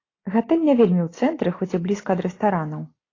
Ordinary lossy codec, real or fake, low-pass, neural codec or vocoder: AAC, 32 kbps; real; 7.2 kHz; none